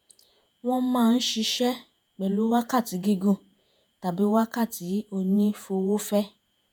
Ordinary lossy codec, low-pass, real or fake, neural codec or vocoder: none; none; fake; vocoder, 48 kHz, 128 mel bands, Vocos